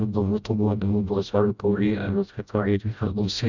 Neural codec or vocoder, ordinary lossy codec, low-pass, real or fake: codec, 16 kHz, 0.5 kbps, FreqCodec, smaller model; Opus, 64 kbps; 7.2 kHz; fake